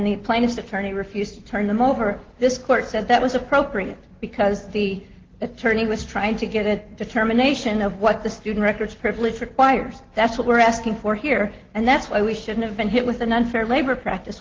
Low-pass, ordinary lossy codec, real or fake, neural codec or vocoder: 7.2 kHz; Opus, 16 kbps; real; none